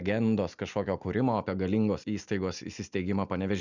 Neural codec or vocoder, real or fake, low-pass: none; real; 7.2 kHz